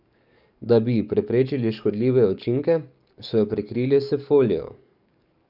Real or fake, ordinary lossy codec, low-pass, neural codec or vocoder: fake; none; 5.4 kHz; codec, 44.1 kHz, 7.8 kbps, DAC